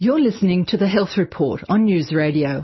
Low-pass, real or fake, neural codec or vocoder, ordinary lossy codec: 7.2 kHz; real; none; MP3, 24 kbps